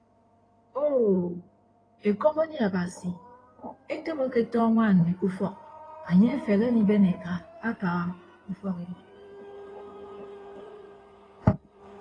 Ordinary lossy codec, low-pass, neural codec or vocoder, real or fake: AAC, 32 kbps; 9.9 kHz; codec, 16 kHz in and 24 kHz out, 2.2 kbps, FireRedTTS-2 codec; fake